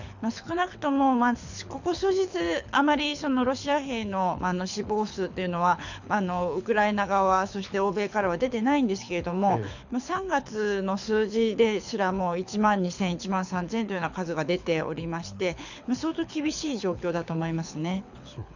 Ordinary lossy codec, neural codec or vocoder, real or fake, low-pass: none; codec, 24 kHz, 6 kbps, HILCodec; fake; 7.2 kHz